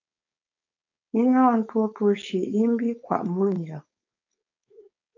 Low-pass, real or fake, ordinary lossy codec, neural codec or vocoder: 7.2 kHz; fake; AAC, 48 kbps; codec, 16 kHz, 4.8 kbps, FACodec